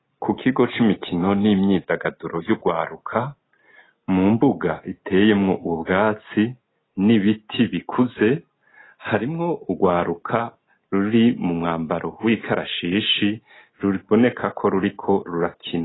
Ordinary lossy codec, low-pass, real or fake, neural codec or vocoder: AAC, 16 kbps; 7.2 kHz; real; none